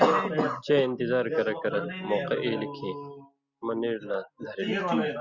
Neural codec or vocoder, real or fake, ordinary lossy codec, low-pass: none; real; Opus, 64 kbps; 7.2 kHz